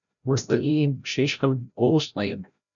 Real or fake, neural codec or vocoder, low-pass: fake; codec, 16 kHz, 0.5 kbps, FreqCodec, larger model; 7.2 kHz